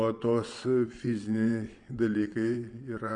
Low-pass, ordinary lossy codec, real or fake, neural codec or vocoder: 9.9 kHz; MP3, 64 kbps; fake; vocoder, 22.05 kHz, 80 mel bands, WaveNeXt